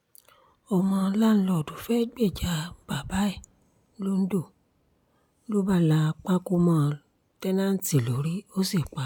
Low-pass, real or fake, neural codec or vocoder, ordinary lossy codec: none; real; none; none